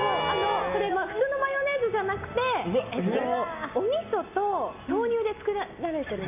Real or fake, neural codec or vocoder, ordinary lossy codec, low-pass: real; none; none; 3.6 kHz